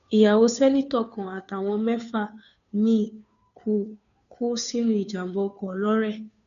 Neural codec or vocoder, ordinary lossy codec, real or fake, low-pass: codec, 16 kHz, 2 kbps, FunCodec, trained on Chinese and English, 25 frames a second; none; fake; 7.2 kHz